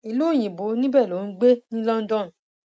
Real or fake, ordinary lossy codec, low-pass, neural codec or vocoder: real; none; none; none